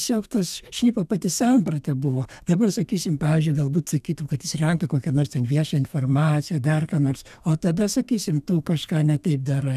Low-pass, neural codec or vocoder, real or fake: 14.4 kHz; codec, 32 kHz, 1.9 kbps, SNAC; fake